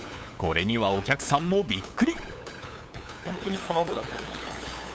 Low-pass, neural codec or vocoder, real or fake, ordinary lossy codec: none; codec, 16 kHz, 8 kbps, FunCodec, trained on LibriTTS, 25 frames a second; fake; none